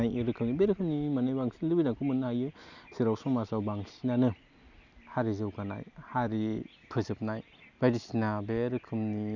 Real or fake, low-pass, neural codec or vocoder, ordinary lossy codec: real; 7.2 kHz; none; none